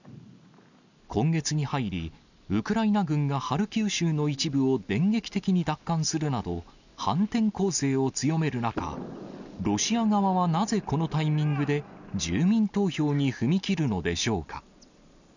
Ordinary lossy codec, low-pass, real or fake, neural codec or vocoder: none; 7.2 kHz; real; none